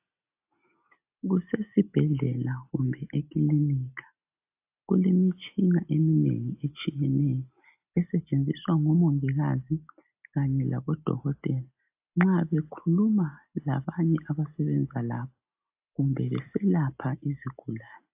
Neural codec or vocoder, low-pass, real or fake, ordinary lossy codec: none; 3.6 kHz; real; AAC, 32 kbps